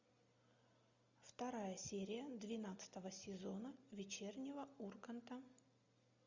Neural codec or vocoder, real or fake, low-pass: none; real; 7.2 kHz